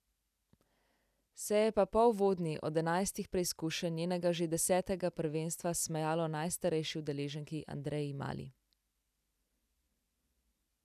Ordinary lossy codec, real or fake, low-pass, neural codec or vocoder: none; real; 14.4 kHz; none